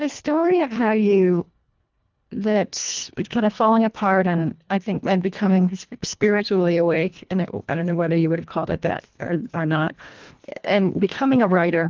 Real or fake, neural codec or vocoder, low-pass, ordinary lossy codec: fake; codec, 24 kHz, 1.5 kbps, HILCodec; 7.2 kHz; Opus, 24 kbps